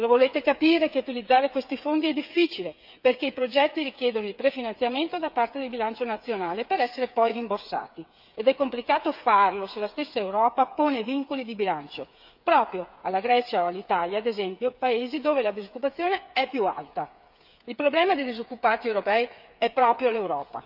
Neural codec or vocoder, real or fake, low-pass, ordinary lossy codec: codec, 16 kHz, 8 kbps, FreqCodec, smaller model; fake; 5.4 kHz; none